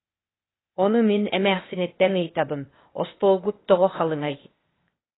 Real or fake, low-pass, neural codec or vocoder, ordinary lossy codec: fake; 7.2 kHz; codec, 16 kHz, 0.8 kbps, ZipCodec; AAC, 16 kbps